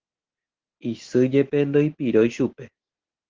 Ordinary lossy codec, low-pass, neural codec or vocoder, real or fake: Opus, 16 kbps; 7.2 kHz; none; real